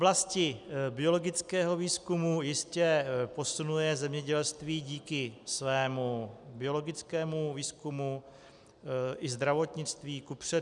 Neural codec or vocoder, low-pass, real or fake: none; 10.8 kHz; real